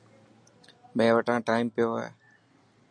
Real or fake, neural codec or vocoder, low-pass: real; none; 9.9 kHz